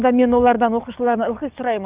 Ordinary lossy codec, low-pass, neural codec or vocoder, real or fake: Opus, 24 kbps; 3.6 kHz; codec, 44.1 kHz, 7.8 kbps, DAC; fake